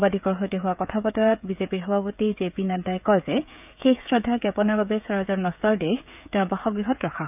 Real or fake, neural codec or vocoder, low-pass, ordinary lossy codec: fake; codec, 16 kHz, 16 kbps, FreqCodec, smaller model; 3.6 kHz; none